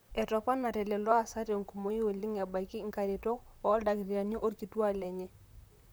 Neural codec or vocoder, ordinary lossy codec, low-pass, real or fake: vocoder, 44.1 kHz, 128 mel bands, Pupu-Vocoder; none; none; fake